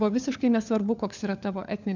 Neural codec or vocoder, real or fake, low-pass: codec, 16 kHz, 4 kbps, FunCodec, trained on LibriTTS, 50 frames a second; fake; 7.2 kHz